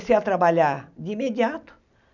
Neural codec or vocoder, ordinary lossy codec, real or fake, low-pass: none; none; real; 7.2 kHz